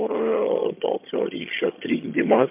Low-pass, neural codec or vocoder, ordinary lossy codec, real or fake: 3.6 kHz; vocoder, 22.05 kHz, 80 mel bands, HiFi-GAN; MP3, 32 kbps; fake